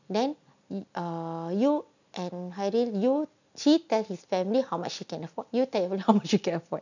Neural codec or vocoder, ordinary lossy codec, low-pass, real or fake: none; none; 7.2 kHz; real